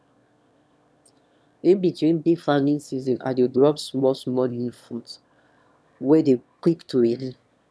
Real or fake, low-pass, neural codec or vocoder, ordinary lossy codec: fake; none; autoencoder, 22.05 kHz, a latent of 192 numbers a frame, VITS, trained on one speaker; none